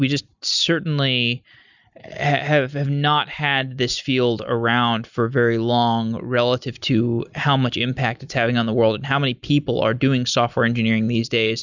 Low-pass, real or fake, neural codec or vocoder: 7.2 kHz; real; none